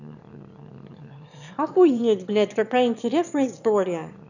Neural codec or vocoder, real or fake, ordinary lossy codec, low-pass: autoencoder, 22.05 kHz, a latent of 192 numbers a frame, VITS, trained on one speaker; fake; none; 7.2 kHz